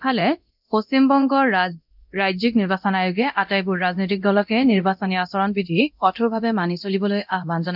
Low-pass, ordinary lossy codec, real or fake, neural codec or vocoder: 5.4 kHz; none; fake; codec, 24 kHz, 0.9 kbps, DualCodec